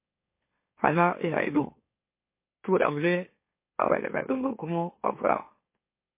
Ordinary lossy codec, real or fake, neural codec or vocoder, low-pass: MP3, 24 kbps; fake; autoencoder, 44.1 kHz, a latent of 192 numbers a frame, MeloTTS; 3.6 kHz